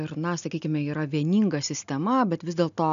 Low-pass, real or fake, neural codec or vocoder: 7.2 kHz; real; none